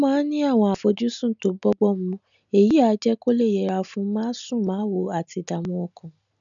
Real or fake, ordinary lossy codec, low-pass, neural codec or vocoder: real; none; 7.2 kHz; none